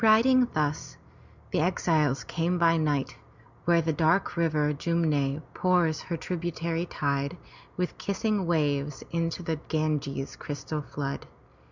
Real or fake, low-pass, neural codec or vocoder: real; 7.2 kHz; none